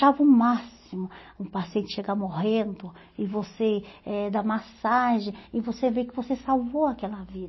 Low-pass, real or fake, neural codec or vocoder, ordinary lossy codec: 7.2 kHz; real; none; MP3, 24 kbps